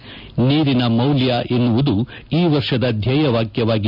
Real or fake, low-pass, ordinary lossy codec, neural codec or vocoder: real; 5.4 kHz; none; none